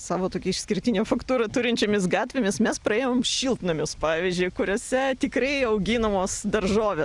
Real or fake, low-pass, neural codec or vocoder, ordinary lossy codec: real; 10.8 kHz; none; Opus, 64 kbps